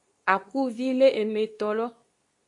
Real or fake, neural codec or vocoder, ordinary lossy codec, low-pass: fake; codec, 24 kHz, 0.9 kbps, WavTokenizer, medium speech release version 2; AAC, 64 kbps; 10.8 kHz